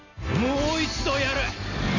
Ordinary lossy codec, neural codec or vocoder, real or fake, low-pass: none; none; real; 7.2 kHz